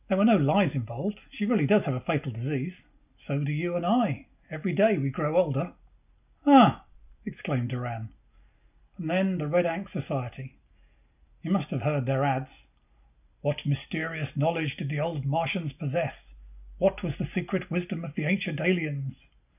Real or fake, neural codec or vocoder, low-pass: real; none; 3.6 kHz